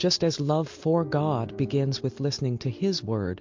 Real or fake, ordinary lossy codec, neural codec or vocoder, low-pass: real; MP3, 48 kbps; none; 7.2 kHz